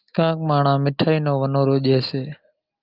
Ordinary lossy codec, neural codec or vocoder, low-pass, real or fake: Opus, 32 kbps; none; 5.4 kHz; real